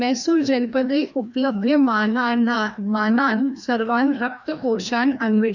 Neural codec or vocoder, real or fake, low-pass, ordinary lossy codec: codec, 16 kHz, 1 kbps, FreqCodec, larger model; fake; 7.2 kHz; none